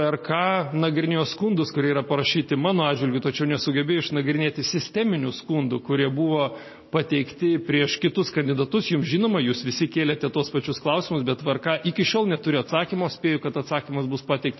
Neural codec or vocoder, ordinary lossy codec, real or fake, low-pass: none; MP3, 24 kbps; real; 7.2 kHz